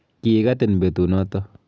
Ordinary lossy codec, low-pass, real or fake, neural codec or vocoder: none; none; real; none